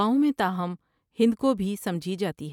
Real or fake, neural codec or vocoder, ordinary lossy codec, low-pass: real; none; none; 19.8 kHz